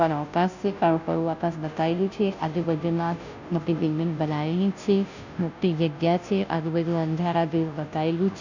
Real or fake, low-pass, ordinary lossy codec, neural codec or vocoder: fake; 7.2 kHz; none; codec, 16 kHz, 0.5 kbps, FunCodec, trained on Chinese and English, 25 frames a second